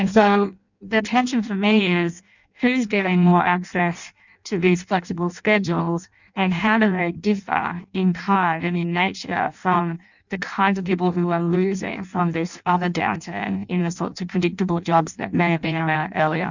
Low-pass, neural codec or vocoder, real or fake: 7.2 kHz; codec, 16 kHz in and 24 kHz out, 0.6 kbps, FireRedTTS-2 codec; fake